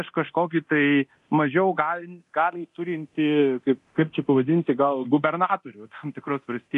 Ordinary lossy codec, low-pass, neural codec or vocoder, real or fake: AAC, 96 kbps; 10.8 kHz; codec, 24 kHz, 0.9 kbps, DualCodec; fake